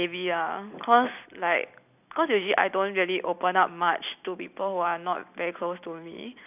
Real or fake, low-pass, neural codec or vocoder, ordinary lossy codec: real; 3.6 kHz; none; none